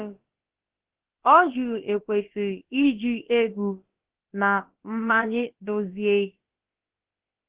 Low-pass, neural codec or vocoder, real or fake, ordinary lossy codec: 3.6 kHz; codec, 16 kHz, about 1 kbps, DyCAST, with the encoder's durations; fake; Opus, 16 kbps